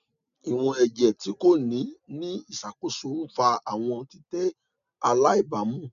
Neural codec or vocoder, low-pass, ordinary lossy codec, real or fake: none; 7.2 kHz; none; real